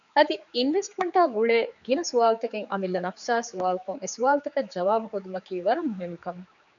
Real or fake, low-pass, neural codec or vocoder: fake; 7.2 kHz; codec, 16 kHz, 4 kbps, X-Codec, HuBERT features, trained on general audio